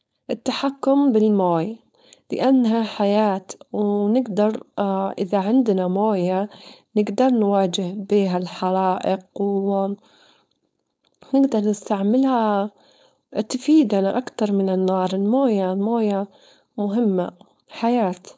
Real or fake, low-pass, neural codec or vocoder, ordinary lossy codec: fake; none; codec, 16 kHz, 4.8 kbps, FACodec; none